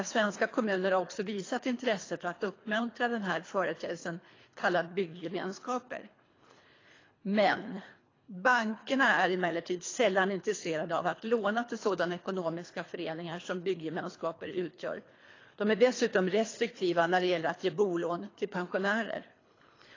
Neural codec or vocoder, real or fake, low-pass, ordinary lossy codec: codec, 24 kHz, 3 kbps, HILCodec; fake; 7.2 kHz; AAC, 32 kbps